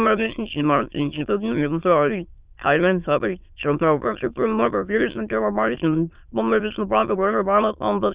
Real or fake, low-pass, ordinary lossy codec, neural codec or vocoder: fake; 3.6 kHz; Opus, 64 kbps; autoencoder, 22.05 kHz, a latent of 192 numbers a frame, VITS, trained on many speakers